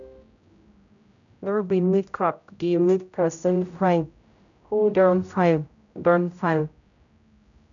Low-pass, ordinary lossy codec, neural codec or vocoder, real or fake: 7.2 kHz; none; codec, 16 kHz, 0.5 kbps, X-Codec, HuBERT features, trained on general audio; fake